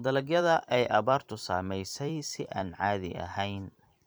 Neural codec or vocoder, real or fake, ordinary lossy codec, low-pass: vocoder, 44.1 kHz, 128 mel bands every 256 samples, BigVGAN v2; fake; none; none